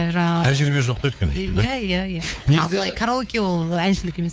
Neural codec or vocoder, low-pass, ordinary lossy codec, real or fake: codec, 16 kHz, 4 kbps, X-Codec, WavLM features, trained on Multilingual LibriSpeech; none; none; fake